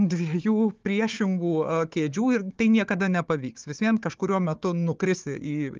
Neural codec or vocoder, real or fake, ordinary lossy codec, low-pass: codec, 16 kHz, 4 kbps, FunCodec, trained on Chinese and English, 50 frames a second; fake; Opus, 32 kbps; 7.2 kHz